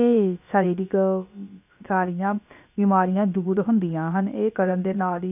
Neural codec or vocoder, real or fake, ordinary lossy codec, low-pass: codec, 16 kHz, about 1 kbps, DyCAST, with the encoder's durations; fake; none; 3.6 kHz